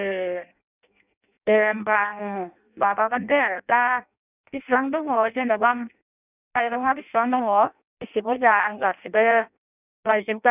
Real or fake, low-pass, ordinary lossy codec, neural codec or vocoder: fake; 3.6 kHz; none; codec, 16 kHz in and 24 kHz out, 0.6 kbps, FireRedTTS-2 codec